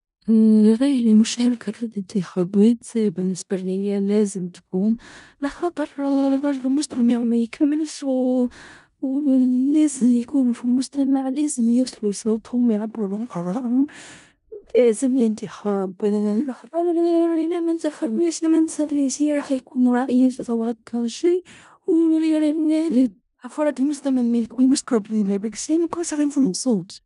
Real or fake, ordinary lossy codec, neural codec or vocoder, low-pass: fake; none; codec, 16 kHz in and 24 kHz out, 0.4 kbps, LongCat-Audio-Codec, four codebook decoder; 10.8 kHz